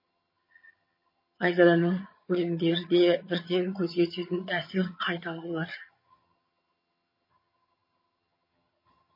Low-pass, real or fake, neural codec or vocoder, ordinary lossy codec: 5.4 kHz; fake; vocoder, 22.05 kHz, 80 mel bands, HiFi-GAN; MP3, 24 kbps